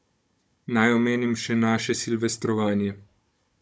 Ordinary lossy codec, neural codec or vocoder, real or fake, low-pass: none; codec, 16 kHz, 16 kbps, FunCodec, trained on Chinese and English, 50 frames a second; fake; none